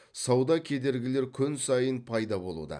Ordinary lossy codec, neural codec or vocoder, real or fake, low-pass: none; none; real; 9.9 kHz